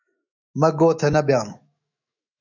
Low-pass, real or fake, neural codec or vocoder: 7.2 kHz; fake; autoencoder, 48 kHz, 128 numbers a frame, DAC-VAE, trained on Japanese speech